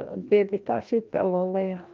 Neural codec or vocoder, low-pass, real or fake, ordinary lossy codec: codec, 16 kHz, 1 kbps, FreqCodec, larger model; 7.2 kHz; fake; Opus, 24 kbps